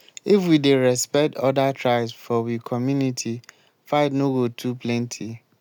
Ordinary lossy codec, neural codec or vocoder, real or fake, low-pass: none; none; real; 19.8 kHz